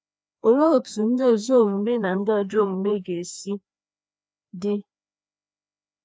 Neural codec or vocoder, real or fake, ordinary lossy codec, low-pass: codec, 16 kHz, 2 kbps, FreqCodec, larger model; fake; none; none